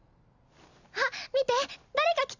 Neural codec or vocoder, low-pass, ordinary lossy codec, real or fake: none; 7.2 kHz; none; real